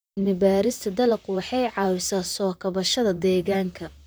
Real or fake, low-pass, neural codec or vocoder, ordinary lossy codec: fake; none; vocoder, 44.1 kHz, 128 mel bands, Pupu-Vocoder; none